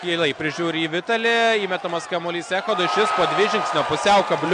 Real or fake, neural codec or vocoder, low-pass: real; none; 9.9 kHz